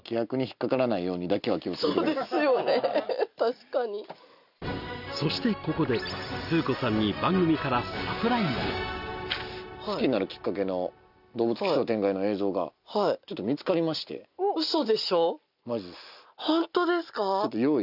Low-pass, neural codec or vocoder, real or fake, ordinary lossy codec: 5.4 kHz; none; real; none